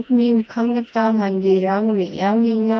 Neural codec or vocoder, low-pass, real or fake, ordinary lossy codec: codec, 16 kHz, 1 kbps, FreqCodec, smaller model; none; fake; none